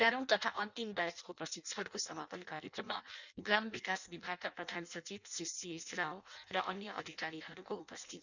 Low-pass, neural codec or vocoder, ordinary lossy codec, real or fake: 7.2 kHz; codec, 16 kHz in and 24 kHz out, 0.6 kbps, FireRedTTS-2 codec; none; fake